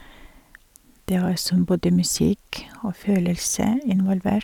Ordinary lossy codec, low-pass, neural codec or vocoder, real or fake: none; 19.8 kHz; vocoder, 44.1 kHz, 128 mel bands every 512 samples, BigVGAN v2; fake